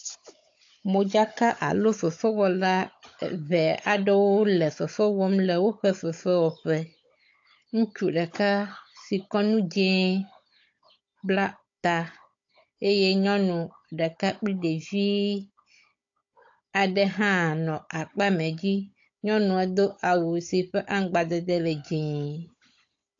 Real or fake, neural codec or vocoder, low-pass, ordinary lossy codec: fake; codec, 16 kHz, 16 kbps, FunCodec, trained on Chinese and English, 50 frames a second; 7.2 kHz; AAC, 48 kbps